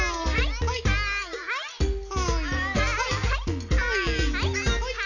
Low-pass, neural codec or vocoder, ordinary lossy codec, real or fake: 7.2 kHz; none; none; real